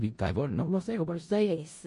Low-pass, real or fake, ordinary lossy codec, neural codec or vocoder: 10.8 kHz; fake; MP3, 48 kbps; codec, 16 kHz in and 24 kHz out, 0.4 kbps, LongCat-Audio-Codec, four codebook decoder